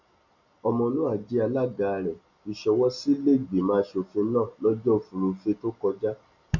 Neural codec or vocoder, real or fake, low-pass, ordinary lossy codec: none; real; 7.2 kHz; none